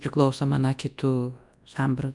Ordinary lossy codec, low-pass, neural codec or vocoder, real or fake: MP3, 96 kbps; 10.8 kHz; codec, 24 kHz, 0.9 kbps, WavTokenizer, large speech release; fake